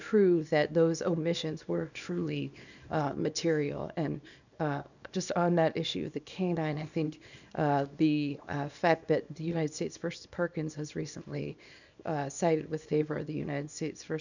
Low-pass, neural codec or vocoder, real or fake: 7.2 kHz; codec, 24 kHz, 0.9 kbps, WavTokenizer, small release; fake